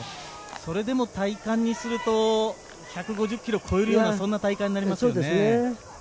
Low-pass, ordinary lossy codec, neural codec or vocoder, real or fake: none; none; none; real